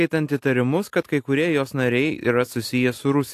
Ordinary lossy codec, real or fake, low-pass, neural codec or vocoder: AAC, 64 kbps; real; 14.4 kHz; none